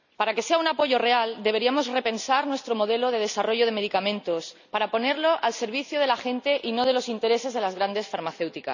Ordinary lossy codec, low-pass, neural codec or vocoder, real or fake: none; 7.2 kHz; none; real